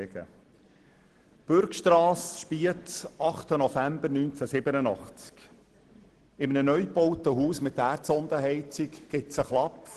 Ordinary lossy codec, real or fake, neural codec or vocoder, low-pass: Opus, 16 kbps; real; none; 10.8 kHz